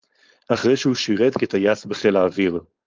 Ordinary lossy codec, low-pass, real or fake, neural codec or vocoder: Opus, 32 kbps; 7.2 kHz; fake; codec, 16 kHz, 4.8 kbps, FACodec